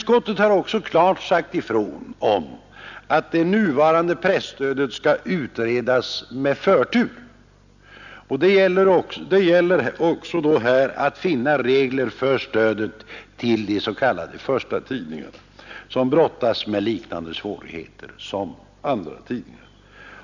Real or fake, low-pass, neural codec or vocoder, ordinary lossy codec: real; 7.2 kHz; none; none